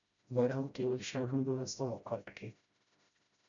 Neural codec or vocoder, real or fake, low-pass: codec, 16 kHz, 0.5 kbps, FreqCodec, smaller model; fake; 7.2 kHz